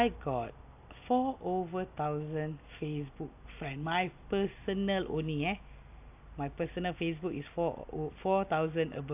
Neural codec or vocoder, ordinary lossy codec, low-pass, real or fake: none; AAC, 32 kbps; 3.6 kHz; real